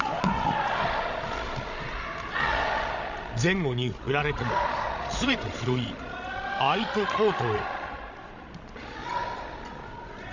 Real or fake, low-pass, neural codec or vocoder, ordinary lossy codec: fake; 7.2 kHz; codec, 16 kHz, 16 kbps, FreqCodec, larger model; none